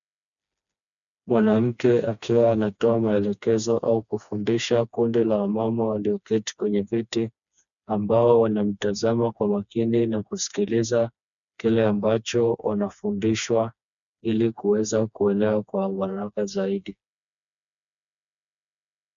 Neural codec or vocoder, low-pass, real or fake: codec, 16 kHz, 2 kbps, FreqCodec, smaller model; 7.2 kHz; fake